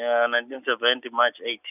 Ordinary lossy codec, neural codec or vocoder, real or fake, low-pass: none; none; real; 3.6 kHz